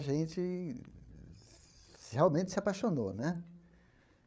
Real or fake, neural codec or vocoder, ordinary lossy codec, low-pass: fake; codec, 16 kHz, 8 kbps, FreqCodec, larger model; none; none